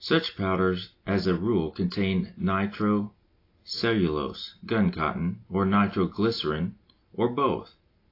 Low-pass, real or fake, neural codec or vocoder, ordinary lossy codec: 5.4 kHz; real; none; AAC, 32 kbps